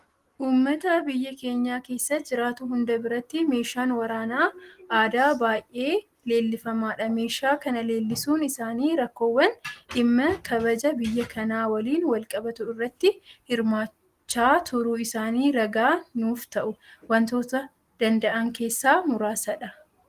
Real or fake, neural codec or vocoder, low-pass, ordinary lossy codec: real; none; 14.4 kHz; Opus, 24 kbps